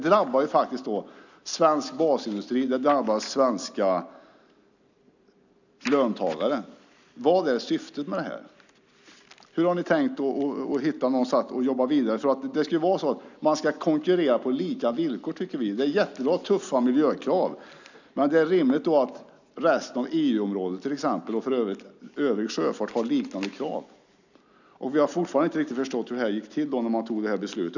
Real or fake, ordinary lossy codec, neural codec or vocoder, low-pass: real; none; none; 7.2 kHz